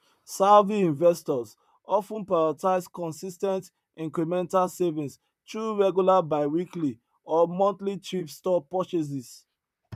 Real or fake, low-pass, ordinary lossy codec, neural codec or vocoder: fake; 14.4 kHz; none; vocoder, 44.1 kHz, 128 mel bands, Pupu-Vocoder